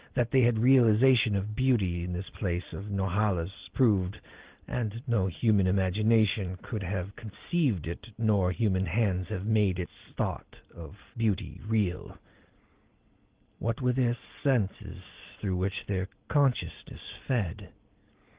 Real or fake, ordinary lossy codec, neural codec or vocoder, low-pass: real; Opus, 16 kbps; none; 3.6 kHz